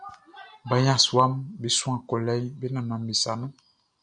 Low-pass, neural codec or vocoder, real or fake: 9.9 kHz; none; real